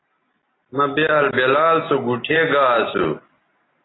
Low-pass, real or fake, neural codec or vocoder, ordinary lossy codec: 7.2 kHz; real; none; AAC, 16 kbps